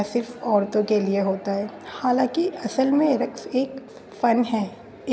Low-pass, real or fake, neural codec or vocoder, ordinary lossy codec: none; real; none; none